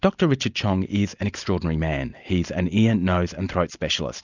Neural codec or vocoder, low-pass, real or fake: none; 7.2 kHz; real